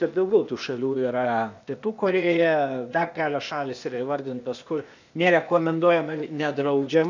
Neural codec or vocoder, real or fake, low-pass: codec, 16 kHz, 0.8 kbps, ZipCodec; fake; 7.2 kHz